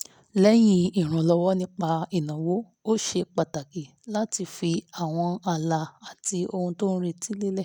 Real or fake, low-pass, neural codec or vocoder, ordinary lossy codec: real; 19.8 kHz; none; none